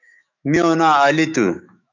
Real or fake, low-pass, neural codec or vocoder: fake; 7.2 kHz; autoencoder, 48 kHz, 128 numbers a frame, DAC-VAE, trained on Japanese speech